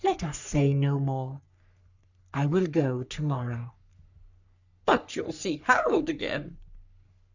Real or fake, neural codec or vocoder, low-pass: fake; codec, 44.1 kHz, 3.4 kbps, Pupu-Codec; 7.2 kHz